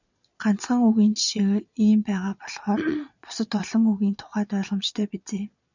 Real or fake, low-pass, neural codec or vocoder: fake; 7.2 kHz; vocoder, 22.05 kHz, 80 mel bands, Vocos